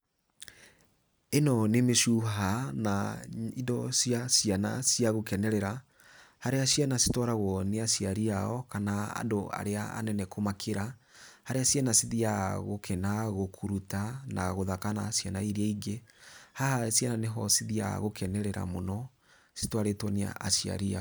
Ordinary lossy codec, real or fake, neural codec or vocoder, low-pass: none; real; none; none